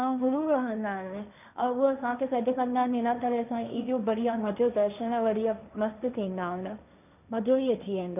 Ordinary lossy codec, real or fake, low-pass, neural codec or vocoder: none; fake; 3.6 kHz; codec, 16 kHz, 1.1 kbps, Voila-Tokenizer